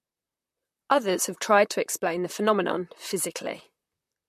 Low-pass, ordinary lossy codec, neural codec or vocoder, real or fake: 14.4 kHz; MP3, 64 kbps; vocoder, 44.1 kHz, 128 mel bands, Pupu-Vocoder; fake